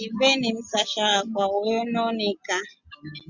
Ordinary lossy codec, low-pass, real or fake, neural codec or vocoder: Opus, 64 kbps; 7.2 kHz; real; none